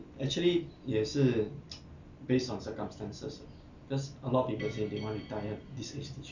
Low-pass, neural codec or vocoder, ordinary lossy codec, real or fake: 7.2 kHz; none; none; real